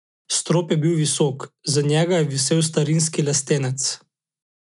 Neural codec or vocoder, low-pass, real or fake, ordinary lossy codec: none; 10.8 kHz; real; none